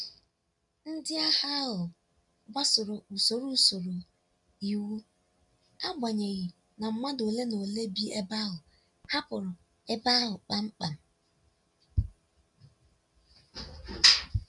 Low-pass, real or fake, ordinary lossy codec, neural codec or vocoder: 10.8 kHz; real; none; none